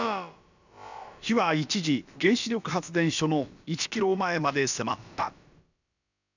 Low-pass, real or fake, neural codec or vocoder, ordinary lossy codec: 7.2 kHz; fake; codec, 16 kHz, about 1 kbps, DyCAST, with the encoder's durations; none